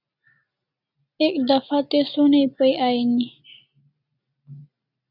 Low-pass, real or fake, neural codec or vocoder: 5.4 kHz; real; none